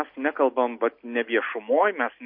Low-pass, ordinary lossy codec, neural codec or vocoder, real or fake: 5.4 kHz; MP3, 32 kbps; none; real